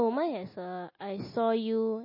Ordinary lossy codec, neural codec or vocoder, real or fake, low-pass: MP3, 24 kbps; none; real; 5.4 kHz